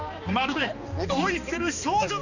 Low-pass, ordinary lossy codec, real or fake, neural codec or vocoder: 7.2 kHz; none; fake; codec, 16 kHz, 2 kbps, X-Codec, HuBERT features, trained on balanced general audio